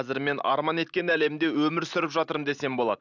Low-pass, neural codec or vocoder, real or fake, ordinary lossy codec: 7.2 kHz; none; real; none